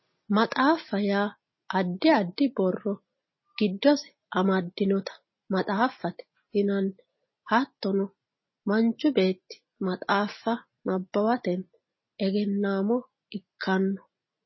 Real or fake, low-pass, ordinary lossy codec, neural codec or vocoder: real; 7.2 kHz; MP3, 24 kbps; none